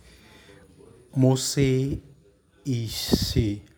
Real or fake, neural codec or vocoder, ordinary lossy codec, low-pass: real; none; none; none